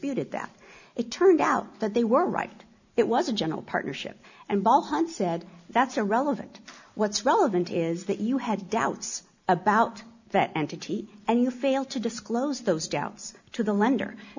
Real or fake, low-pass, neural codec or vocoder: real; 7.2 kHz; none